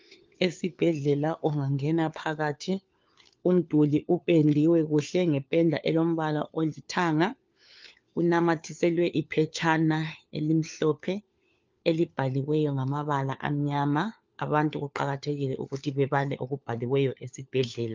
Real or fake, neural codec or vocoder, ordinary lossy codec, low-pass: fake; codec, 16 kHz, 4 kbps, FunCodec, trained on LibriTTS, 50 frames a second; Opus, 24 kbps; 7.2 kHz